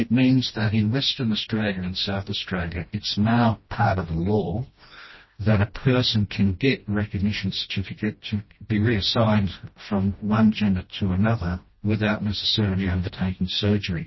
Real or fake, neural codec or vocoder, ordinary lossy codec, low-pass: fake; codec, 16 kHz, 1 kbps, FreqCodec, smaller model; MP3, 24 kbps; 7.2 kHz